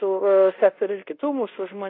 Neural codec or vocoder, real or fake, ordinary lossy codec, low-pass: codec, 24 kHz, 0.5 kbps, DualCodec; fake; AAC, 32 kbps; 5.4 kHz